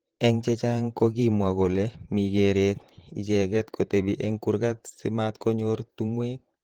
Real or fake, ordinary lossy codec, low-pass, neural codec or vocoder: fake; Opus, 16 kbps; 19.8 kHz; vocoder, 44.1 kHz, 128 mel bands, Pupu-Vocoder